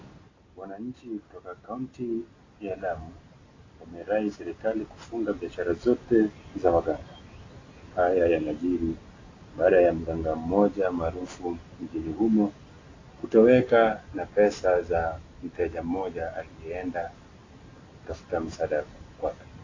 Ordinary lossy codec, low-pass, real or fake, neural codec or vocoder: AAC, 32 kbps; 7.2 kHz; real; none